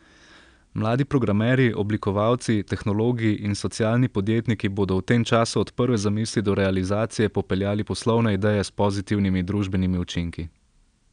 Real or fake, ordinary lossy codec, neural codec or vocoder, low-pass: real; none; none; 9.9 kHz